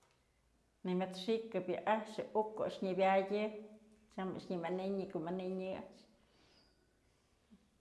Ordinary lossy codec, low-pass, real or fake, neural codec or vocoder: none; none; real; none